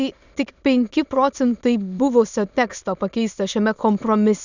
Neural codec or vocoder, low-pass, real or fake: autoencoder, 22.05 kHz, a latent of 192 numbers a frame, VITS, trained on many speakers; 7.2 kHz; fake